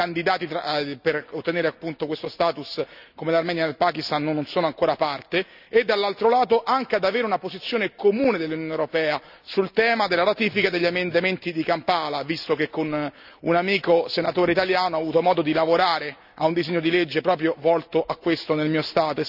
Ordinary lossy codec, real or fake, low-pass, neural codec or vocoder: none; real; 5.4 kHz; none